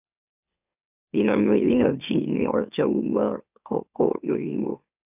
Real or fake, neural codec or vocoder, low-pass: fake; autoencoder, 44.1 kHz, a latent of 192 numbers a frame, MeloTTS; 3.6 kHz